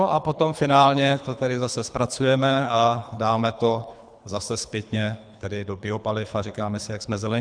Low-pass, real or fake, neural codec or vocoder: 9.9 kHz; fake; codec, 24 kHz, 3 kbps, HILCodec